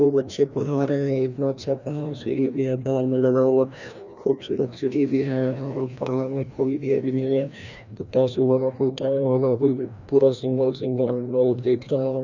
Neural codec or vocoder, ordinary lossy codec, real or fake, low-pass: codec, 16 kHz, 1 kbps, FreqCodec, larger model; none; fake; 7.2 kHz